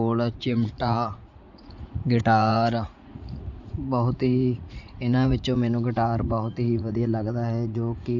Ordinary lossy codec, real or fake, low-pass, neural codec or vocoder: none; fake; 7.2 kHz; vocoder, 44.1 kHz, 80 mel bands, Vocos